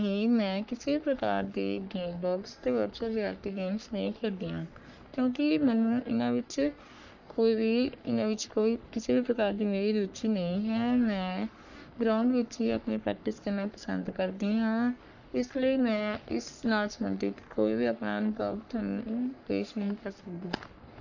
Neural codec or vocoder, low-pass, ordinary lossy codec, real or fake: codec, 44.1 kHz, 3.4 kbps, Pupu-Codec; 7.2 kHz; none; fake